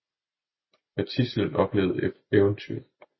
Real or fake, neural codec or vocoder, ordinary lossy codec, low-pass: real; none; MP3, 24 kbps; 7.2 kHz